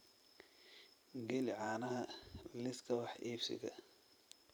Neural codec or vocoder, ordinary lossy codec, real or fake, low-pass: vocoder, 44.1 kHz, 128 mel bands every 512 samples, BigVGAN v2; none; fake; none